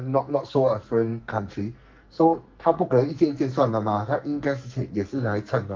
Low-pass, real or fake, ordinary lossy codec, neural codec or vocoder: 7.2 kHz; fake; Opus, 32 kbps; codec, 44.1 kHz, 2.6 kbps, SNAC